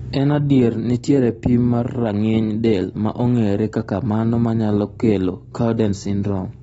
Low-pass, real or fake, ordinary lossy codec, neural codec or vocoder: 19.8 kHz; real; AAC, 24 kbps; none